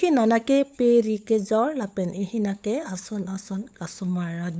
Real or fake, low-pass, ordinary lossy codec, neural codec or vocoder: fake; none; none; codec, 16 kHz, 8 kbps, FunCodec, trained on LibriTTS, 25 frames a second